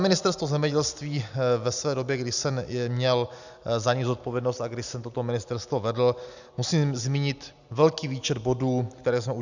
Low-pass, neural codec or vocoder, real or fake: 7.2 kHz; none; real